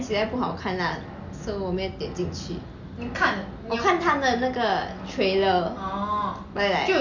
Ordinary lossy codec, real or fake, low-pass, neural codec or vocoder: none; real; 7.2 kHz; none